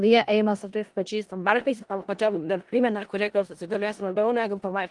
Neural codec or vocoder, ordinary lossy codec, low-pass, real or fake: codec, 16 kHz in and 24 kHz out, 0.4 kbps, LongCat-Audio-Codec, four codebook decoder; Opus, 24 kbps; 10.8 kHz; fake